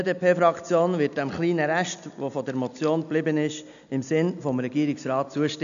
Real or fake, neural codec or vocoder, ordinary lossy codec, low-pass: real; none; none; 7.2 kHz